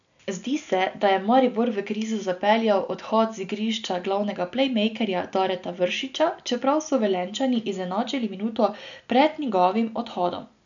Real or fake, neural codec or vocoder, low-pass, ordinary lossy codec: real; none; 7.2 kHz; none